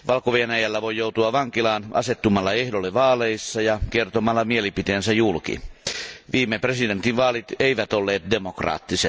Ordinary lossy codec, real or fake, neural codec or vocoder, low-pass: none; real; none; none